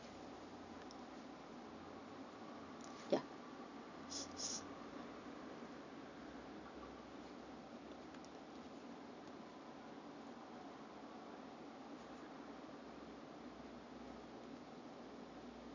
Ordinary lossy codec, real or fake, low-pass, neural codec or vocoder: none; real; 7.2 kHz; none